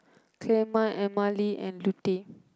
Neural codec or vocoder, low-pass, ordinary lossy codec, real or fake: none; none; none; real